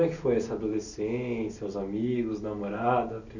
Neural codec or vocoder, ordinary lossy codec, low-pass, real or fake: none; none; 7.2 kHz; real